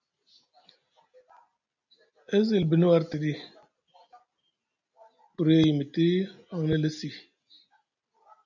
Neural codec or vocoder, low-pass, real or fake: none; 7.2 kHz; real